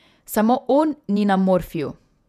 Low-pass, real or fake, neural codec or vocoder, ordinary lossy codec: 14.4 kHz; fake; vocoder, 48 kHz, 128 mel bands, Vocos; none